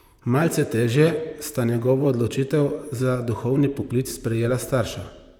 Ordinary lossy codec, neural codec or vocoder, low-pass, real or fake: none; vocoder, 44.1 kHz, 128 mel bands, Pupu-Vocoder; 19.8 kHz; fake